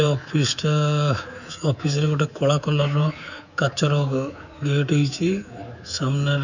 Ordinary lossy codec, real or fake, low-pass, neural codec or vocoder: none; fake; 7.2 kHz; codec, 24 kHz, 3.1 kbps, DualCodec